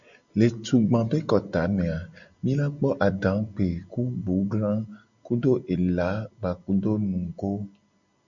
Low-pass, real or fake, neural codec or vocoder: 7.2 kHz; real; none